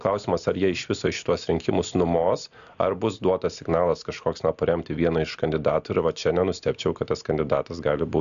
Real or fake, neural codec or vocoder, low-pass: real; none; 7.2 kHz